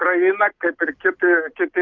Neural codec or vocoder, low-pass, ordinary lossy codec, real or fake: none; 7.2 kHz; Opus, 24 kbps; real